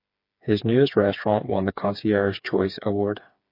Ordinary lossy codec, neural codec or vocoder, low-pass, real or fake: MP3, 32 kbps; codec, 16 kHz, 4 kbps, FreqCodec, smaller model; 5.4 kHz; fake